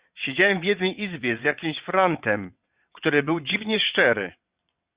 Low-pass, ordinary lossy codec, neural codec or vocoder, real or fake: 3.6 kHz; Opus, 64 kbps; vocoder, 22.05 kHz, 80 mel bands, WaveNeXt; fake